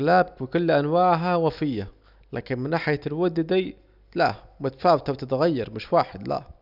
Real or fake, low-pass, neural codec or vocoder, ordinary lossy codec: real; 5.4 kHz; none; none